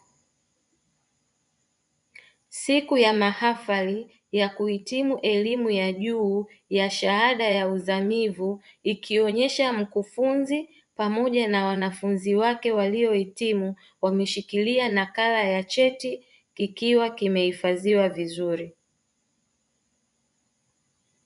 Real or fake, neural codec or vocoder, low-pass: fake; vocoder, 24 kHz, 100 mel bands, Vocos; 10.8 kHz